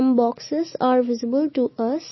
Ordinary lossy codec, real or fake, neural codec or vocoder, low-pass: MP3, 24 kbps; real; none; 7.2 kHz